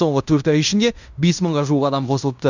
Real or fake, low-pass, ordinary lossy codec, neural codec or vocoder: fake; 7.2 kHz; none; codec, 16 kHz in and 24 kHz out, 0.9 kbps, LongCat-Audio-Codec, fine tuned four codebook decoder